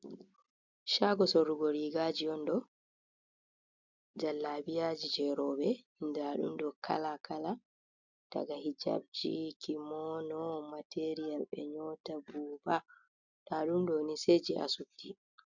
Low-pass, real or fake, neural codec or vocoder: 7.2 kHz; real; none